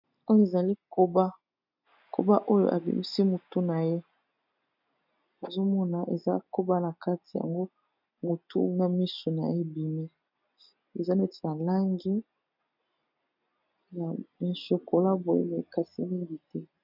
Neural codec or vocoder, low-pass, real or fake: none; 5.4 kHz; real